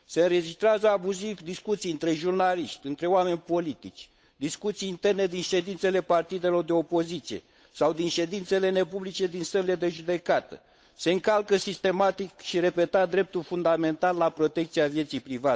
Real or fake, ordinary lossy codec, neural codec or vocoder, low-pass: fake; none; codec, 16 kHz, 8 kbps, FunCodec, trained on Chinese and English, 25 frames a second; none